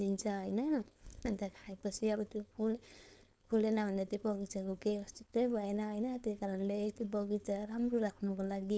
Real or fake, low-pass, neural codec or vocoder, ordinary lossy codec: fake; none; codec, 16 kHz, 4.8 kbps, FACodec; none